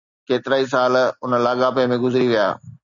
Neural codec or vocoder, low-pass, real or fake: none; 7.2 kHz; real